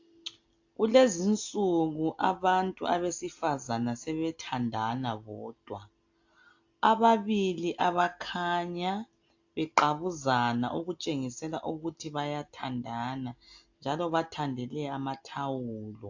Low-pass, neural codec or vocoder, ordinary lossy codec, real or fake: 7.2 kHz; none; AAC, 48 kbps; real